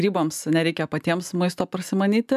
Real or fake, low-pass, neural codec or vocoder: real; 14.4 kHz; none